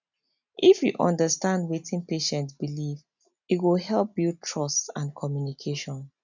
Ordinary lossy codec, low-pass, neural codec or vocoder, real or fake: none; 7.2 kHz; none; real